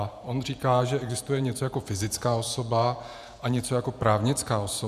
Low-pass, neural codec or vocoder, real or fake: 14.4 kHz; vocoder, 48 kHz, 128 mel bands, Vocos; fake